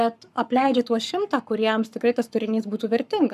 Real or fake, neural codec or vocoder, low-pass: fake; codec, 44.1 kHz, 7.8 kbps, Pupu-Codec; 14.4 kHz